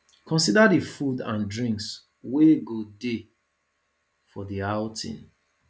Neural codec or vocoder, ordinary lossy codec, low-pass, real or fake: none; none; none; real